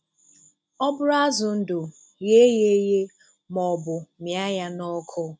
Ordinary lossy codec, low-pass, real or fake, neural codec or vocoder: none; none; real; none